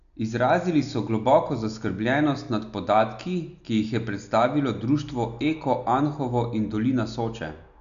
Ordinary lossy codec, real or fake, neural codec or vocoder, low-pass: none; real; none; 7.2 kHz